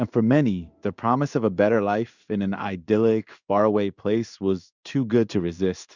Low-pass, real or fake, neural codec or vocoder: 7.2 kHz; fake; codec, 16 kHz in and 24 kHz out, 1 kbps, XY-Tokenizer